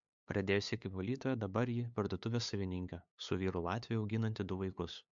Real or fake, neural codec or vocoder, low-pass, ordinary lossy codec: fake; codec, 16 kHz, 8 kbps, FunCodec, trained on LibriTTS, 25 frames a second; 7.2 kHz; MP3, 64 kbps